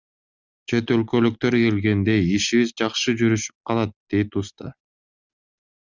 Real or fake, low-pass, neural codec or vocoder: real; 7.2 kHz; none